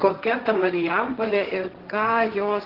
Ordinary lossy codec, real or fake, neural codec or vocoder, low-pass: Opus, 16 kbps; fake; codec, 16 kHz, 1.1 kbps, Voila-Tokenizer; 5.4 kHz